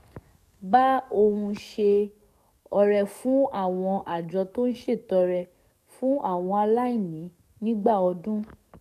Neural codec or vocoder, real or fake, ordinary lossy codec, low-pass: codec, 44.1 kHz, 7.8 kbps, DAC; fake; none; 14.4 kHz